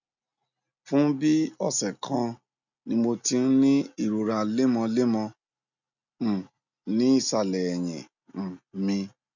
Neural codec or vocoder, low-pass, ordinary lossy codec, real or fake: none; 7.2 kHz; none; real